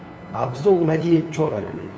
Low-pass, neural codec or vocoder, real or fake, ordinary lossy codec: none; codec, 16 kHz, 2 kbps, FunCodec, trained on LibriTTS, 25 frames a second; fake; none